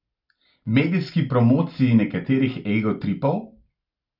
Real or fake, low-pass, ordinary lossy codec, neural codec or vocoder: real; 5.4 kHz; none; none